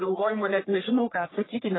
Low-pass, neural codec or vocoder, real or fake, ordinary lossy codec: 7.2 kHz; codec, 44.1 kHz, 1.7 kbps, Pupu-Codec; fake; AAC, 16 kbps